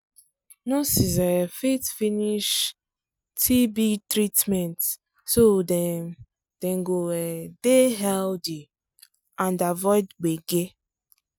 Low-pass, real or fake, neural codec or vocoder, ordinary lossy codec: none; real; none; none